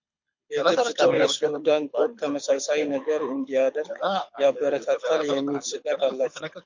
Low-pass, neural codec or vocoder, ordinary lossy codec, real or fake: 7.2 kHz; codec, 24 kHz, 6 kbps, HILCodec; MP3, 48 kbps; fake